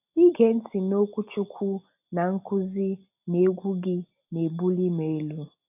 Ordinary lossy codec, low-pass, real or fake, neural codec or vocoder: AAC, 32 kbps; 3.6 kHz; real; none